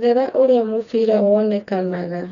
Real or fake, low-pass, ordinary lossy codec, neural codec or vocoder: fake; 7.2 kHz; none; codec, 16 kHz, 2 kbps, FreqCodec, smaller model